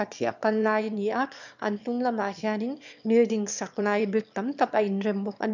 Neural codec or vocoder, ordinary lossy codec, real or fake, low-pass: autoencoder, 22.05 kHz, a latent of 192 numbers a frame, VITS, trained on one speaker; none; fake; 7.2 kHz